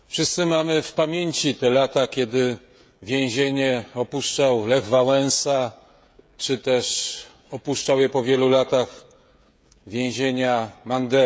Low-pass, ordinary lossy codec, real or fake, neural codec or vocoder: none; none; fake; codec, 16 kHz, 16 kbps, FreqCodec, smaller model